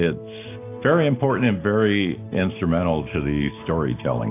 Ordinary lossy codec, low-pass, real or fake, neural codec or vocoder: AAC, 24 kbps; 3.6 kHz; real; none